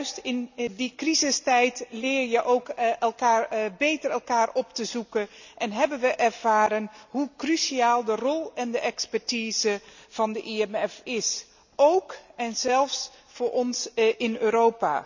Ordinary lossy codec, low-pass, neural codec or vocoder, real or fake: none; 7.2 kHz; none; real